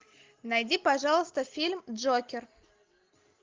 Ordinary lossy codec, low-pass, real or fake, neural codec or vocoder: Opus, 24 kbps; 7.2 kHz; real; none